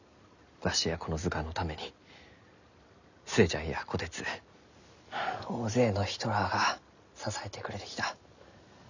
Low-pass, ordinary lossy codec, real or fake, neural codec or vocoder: 7.2 kHz; none; real; none